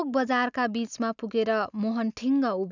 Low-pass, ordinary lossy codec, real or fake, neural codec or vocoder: 7.2 kHz; none; real; none